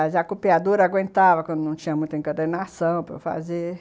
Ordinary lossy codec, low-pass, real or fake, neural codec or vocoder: none; none; real; none